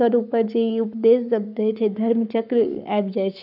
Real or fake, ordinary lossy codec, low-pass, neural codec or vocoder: fake; none; 5.4 kHz; codec, 44.1 kHz, 7.8 kbps, Pupu-Codec